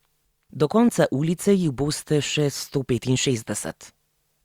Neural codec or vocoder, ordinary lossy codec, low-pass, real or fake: none; Opus, 64 kbps; 19.8 kHz; real